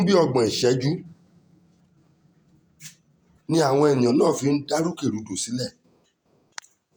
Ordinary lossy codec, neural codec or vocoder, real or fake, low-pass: none; vocoder, 48 kHz, 128 mel bands, Vocos; fake; none